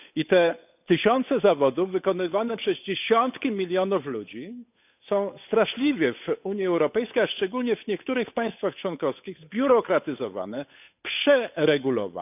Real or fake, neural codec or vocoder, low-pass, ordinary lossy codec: fake; codec, 16 kHz, 8 kbps, FunCodec, trained on Chinese and English, 25 frames a second; 3.6 kHz; none